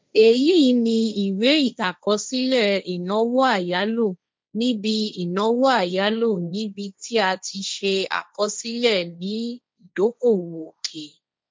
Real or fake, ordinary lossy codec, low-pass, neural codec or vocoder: fake; none; none; codec, 16 kHz, 1.1 kbps, Voila-Tokenizer